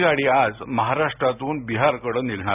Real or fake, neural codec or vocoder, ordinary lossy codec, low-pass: real; none; none; 3.6 kHz